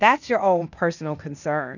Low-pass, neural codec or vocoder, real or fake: 7.2 kHz; codec, 16 kHz, 0.8 kbps, ZipCodec; fake